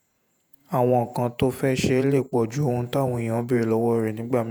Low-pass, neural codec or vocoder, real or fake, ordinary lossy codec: none; vocoder, 48 kHz, 128 mel bands, Vocos; fake; none